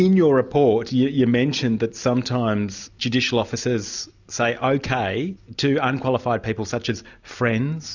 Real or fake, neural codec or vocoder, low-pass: real; none; 7.2 kHz